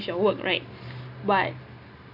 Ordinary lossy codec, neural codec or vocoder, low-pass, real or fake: none; none; 5.4 kHz; real